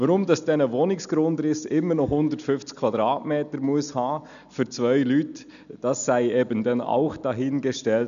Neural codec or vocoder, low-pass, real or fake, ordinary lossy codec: none; 7.2 kHz; real; none